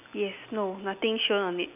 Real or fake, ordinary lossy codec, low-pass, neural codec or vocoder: real; AAC, 32 kbps; 3.6 kHz; none